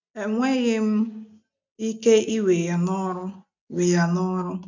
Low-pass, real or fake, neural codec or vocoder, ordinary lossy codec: 7.2 kHz; real; none; none